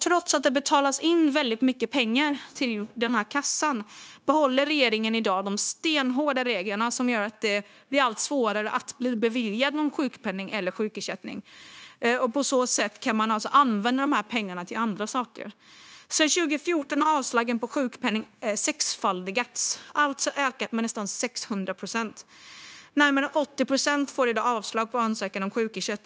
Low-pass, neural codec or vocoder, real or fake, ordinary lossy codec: none; codec, 16 kHz, 0.9 kbps, LongCat-Audio-Codec; fake; none